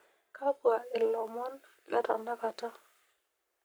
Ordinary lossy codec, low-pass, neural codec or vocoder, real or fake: none; none; codec, 44.1 kHz, 7.8 kbps, Pupu-Codec; fake